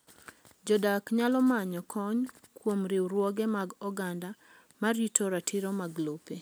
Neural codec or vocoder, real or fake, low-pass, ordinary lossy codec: none; real; none; none